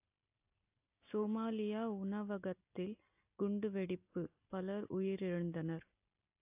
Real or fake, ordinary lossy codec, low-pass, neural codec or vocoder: real; AAC, 32 kbps; 3.6 kHz; none